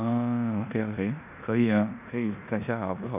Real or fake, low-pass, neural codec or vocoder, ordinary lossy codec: fake; 3.6 kHz; codec, 16 kHz in and 24 kHz out, 0.9 kbps, LongCat-Audio-Codec, four codebook decoder; none